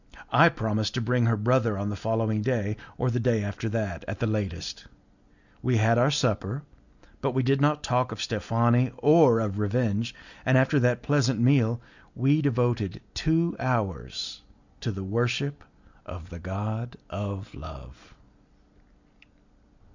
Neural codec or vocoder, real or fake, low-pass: none; real; 7.2 kHz